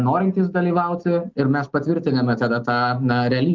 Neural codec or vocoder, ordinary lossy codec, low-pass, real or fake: none; Opus, 32 kbps; 7.2 kHz; real